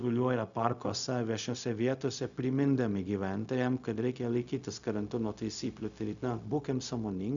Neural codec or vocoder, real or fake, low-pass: codec, 16 kHz, 0.4 kbps, LongCat-Audio-Codec; fake; 7.2 kHz